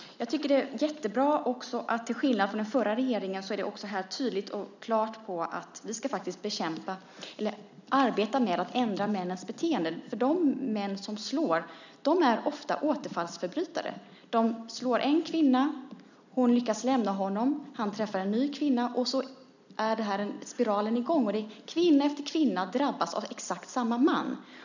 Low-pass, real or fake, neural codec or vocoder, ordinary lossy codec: 7.2 kHz; real; none; none